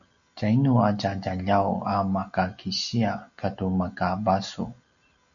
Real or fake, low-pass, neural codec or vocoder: real; 7.2 kHz; none